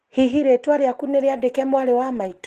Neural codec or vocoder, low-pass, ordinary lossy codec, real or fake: none; 14.4 kHz; Opus, 16 kbps; real